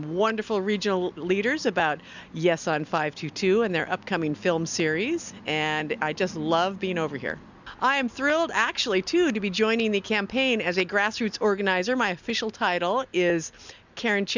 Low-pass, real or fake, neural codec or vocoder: 7.2 kHz; real; none